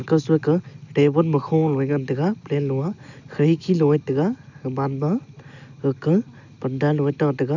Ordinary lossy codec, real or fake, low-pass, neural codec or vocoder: none; real; 7.2 kHz; none